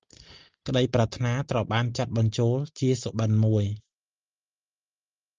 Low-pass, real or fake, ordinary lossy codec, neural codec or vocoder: 7.2 kHz; real; Opus, 24 kbps; none